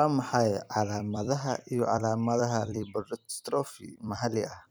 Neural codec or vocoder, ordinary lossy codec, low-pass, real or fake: vocoder, 44.1 kHz, 128 mel bands every 256 samples, BigVGAN v2; none; none; fake